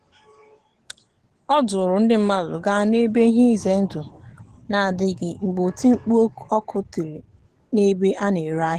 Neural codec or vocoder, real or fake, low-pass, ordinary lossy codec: codec, 44.1 kHz, 7.8 kbps, DAC; fake; 14.4 kHz; Opus, 16 kbps